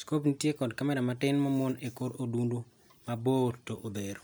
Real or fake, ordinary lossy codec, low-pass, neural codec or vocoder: real; none; none; none